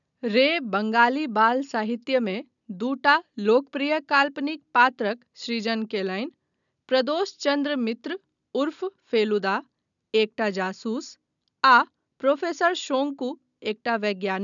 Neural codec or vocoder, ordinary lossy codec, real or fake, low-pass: none; none; real; 7.2 kHz